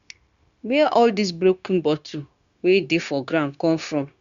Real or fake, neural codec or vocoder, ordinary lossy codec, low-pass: fake; codec, 16 kHz, 0.9 kbps, LongCat-Audio-Codec; Opus, 64 kbps; 7.2 kHz